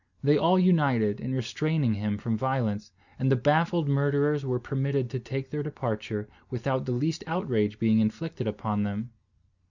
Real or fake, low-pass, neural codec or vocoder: real; 7.2 kHz; none